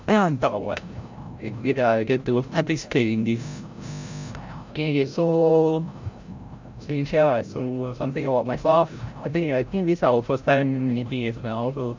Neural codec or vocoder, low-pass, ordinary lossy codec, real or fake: codec, 16 kHz, 0.5 kbps, FreqCodec, larger model; 7.2 kHz; MP3, 64 kbps; fake